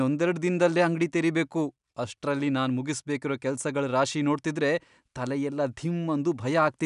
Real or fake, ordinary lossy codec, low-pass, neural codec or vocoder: real; none; 10.8 kHz; none